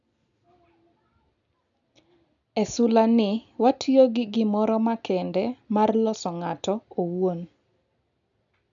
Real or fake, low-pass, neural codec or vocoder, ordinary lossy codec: real; 7.2 kHz; none; none